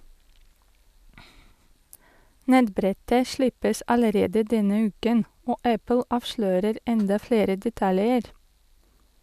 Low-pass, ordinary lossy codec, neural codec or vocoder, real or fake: 14.4 kHz; none; none; real